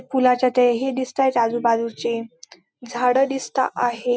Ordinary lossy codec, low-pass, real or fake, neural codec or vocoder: none; none; real; none